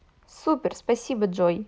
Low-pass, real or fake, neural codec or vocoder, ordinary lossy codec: none; real; none; none